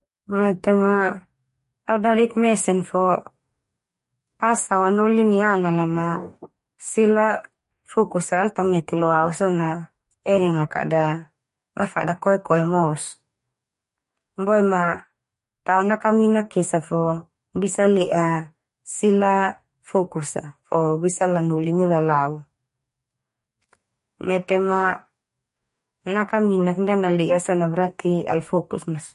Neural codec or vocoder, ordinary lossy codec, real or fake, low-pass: codec, 44.1 kHz, 2.6 kbps, DAC; MP3, 48 kbps; fake; 14.4 kHz